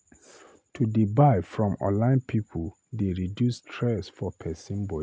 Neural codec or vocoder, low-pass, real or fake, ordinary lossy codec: none; none; real; none